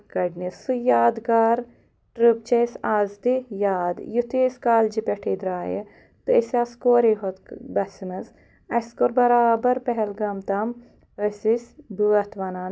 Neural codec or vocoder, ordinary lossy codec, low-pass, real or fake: none; none; none; real